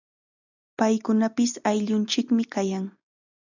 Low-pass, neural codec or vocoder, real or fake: 7.2 kHz; none; real